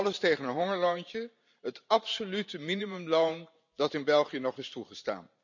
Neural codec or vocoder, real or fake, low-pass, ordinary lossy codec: vocoder, 44.1 kHz, 128 mel bands every 512 samples, BigVGAN v2; fake; 7.2 kHz; none